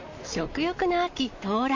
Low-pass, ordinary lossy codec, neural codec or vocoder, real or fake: 7.2 kHz; MP3, 48 kbps; vocoder, 44.1 kHz, 128 mel bands, Pupu-Vocoder; fake